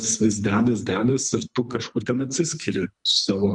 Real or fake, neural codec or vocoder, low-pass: fake; codec, 24 kHz, 3 kbps, HILCodec; 10.8 kHz